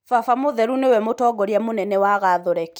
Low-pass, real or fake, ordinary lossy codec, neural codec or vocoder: none; real; none; none